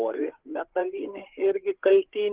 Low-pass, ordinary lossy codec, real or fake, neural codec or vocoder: 3.6 kHz; Opus, 16 kbps; fake; codec, 16 kHz, 8 kbps, FreqCodec, larger model